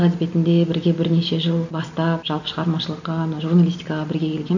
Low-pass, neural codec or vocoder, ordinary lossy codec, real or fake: 7.2 kHz; none; none; real